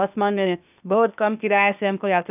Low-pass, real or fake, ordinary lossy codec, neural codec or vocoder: 3.6 kHz; fake; none; codec, 16 kHz, 0.8 kbps, ZipCodec